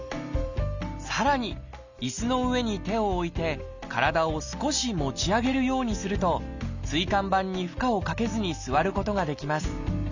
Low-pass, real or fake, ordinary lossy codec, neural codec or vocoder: 7.2 kHz; real; none; none